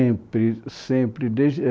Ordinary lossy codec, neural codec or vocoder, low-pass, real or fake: none; none; none; real